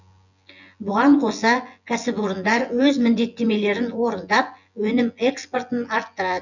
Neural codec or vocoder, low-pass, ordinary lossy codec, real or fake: vocoder, 24 kHz, 100 mel bands, Vocos; 7.2 kHz; none; fake